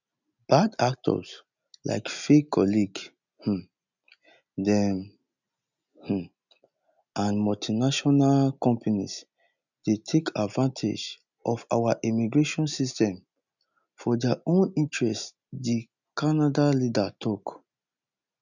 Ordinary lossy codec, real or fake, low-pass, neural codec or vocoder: none; real; 7.2 kHz; none